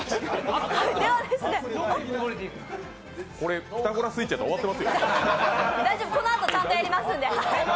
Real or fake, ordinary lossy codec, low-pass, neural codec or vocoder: real; none; none; none